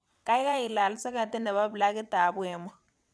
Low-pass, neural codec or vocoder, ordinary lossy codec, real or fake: none; vocoder, 22.05 kHz, 80 mel bands, WaveNeXt; none; fake